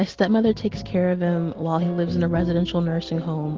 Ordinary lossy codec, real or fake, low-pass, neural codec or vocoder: Opus, 16 kbps; real; 7.2 kHz; none